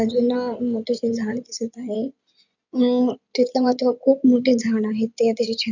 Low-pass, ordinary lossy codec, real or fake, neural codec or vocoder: 7.2 kHz; none; fake; codec, 16 kHz in and 24 kHz out, 2.2 kbps, FireRedTTS-2 codec